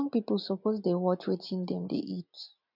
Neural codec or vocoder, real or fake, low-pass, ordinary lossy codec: none; real; 5.4 kHz; none